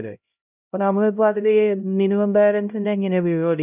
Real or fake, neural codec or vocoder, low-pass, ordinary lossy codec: fake; codec, 16 kHz, 0.5 kbps, X-Codec, HuBERT features, trained on LibriSpeech; 3.6 kHz; none